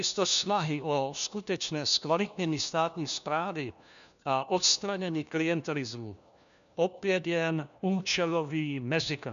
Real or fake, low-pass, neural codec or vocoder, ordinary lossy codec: fake; 7.2 kHz; codec, 16 kHz, 1 kbps, FunCodec, trained on LibriTTS, 50 frames a second; MP3, 96 kbps